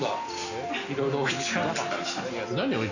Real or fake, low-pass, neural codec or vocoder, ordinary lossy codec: real; 7.2 kHz; none; none